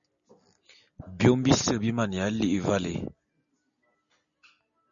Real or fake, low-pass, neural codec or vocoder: real; 7.2 kHz; none